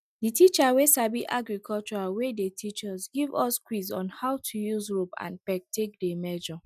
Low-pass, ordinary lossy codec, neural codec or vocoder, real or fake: 14.4 kHz; none; none; real